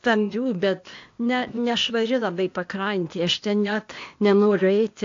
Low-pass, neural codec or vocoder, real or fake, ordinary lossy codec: 7.2 kHz; codec, 16 kHz, 0.8 kbps, ZipCodec; fake; AAC, 48 kbps